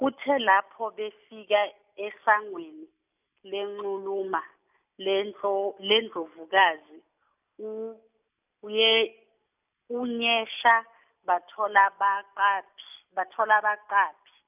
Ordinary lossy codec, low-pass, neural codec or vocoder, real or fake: none; 3.6 kHz; none; real